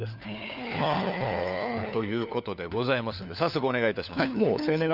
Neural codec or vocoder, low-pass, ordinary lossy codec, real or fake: codec, 16 kHz, 4 kbps, FunCodec, trained on LibriTTS, 50 frames a second; 5.4 kHz; none; fake